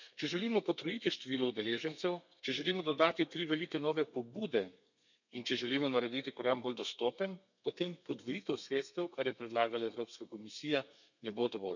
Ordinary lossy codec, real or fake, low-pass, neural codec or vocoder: none; fake; 7.2 kHz; codec, 32 kHz, 1.9 kbps, SNAC